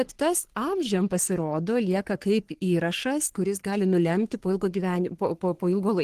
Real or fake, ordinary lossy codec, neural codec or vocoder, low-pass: fake; Opus, 16 kbps; codec, 44.1 kHz, 3.4 kbps, Pupu-Codec; 14.4 kHz